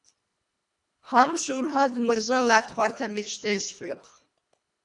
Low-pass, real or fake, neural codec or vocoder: 10.8 kHz; fake; codec, 24 kHz, 1.5 kbps, HILCodec